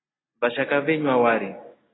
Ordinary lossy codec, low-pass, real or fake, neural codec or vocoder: AAC, 16 kbps; 7.2 kHz; real; none